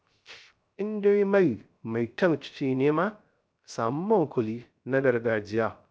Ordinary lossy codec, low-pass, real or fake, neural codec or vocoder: none; none; fake; codec, 16 kHz, 0.3 kbps, FocalCodec